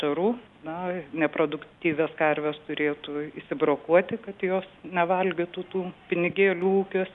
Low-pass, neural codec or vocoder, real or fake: 10.8 kHz; none; real